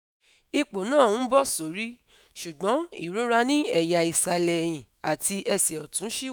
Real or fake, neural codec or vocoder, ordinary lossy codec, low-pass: fake; autoencoder, 48 kHz, 128 numbers a frame, DAC-VAE, trained on Japanese speech; none; none